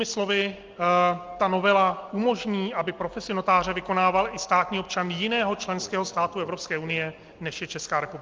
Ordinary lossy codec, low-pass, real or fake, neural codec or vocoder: Opus, 32 kbps; 7.2 kHz; real; none